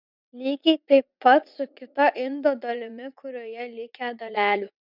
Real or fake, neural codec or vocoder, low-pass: fake; vocoder, 44.1 kHz, 80 mel bands, Vocos; 5.4 kHz